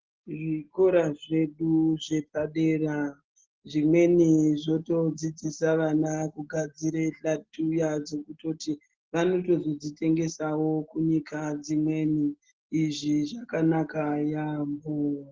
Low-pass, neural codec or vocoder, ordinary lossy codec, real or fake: 7.2 kHz; none; Opus, 16 kbps; real